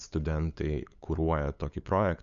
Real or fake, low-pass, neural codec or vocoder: fake; 7.2 kHz; codec, 16 kHz, 8 kbps, FunCodec, trained on LibriTTS, 25 frames a second